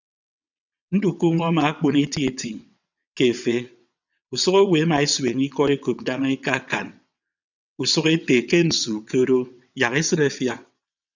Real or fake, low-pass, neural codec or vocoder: fake; 7.2 kHz; vocoder, 22.05 kHz, 80 mel bands, WaveNeXt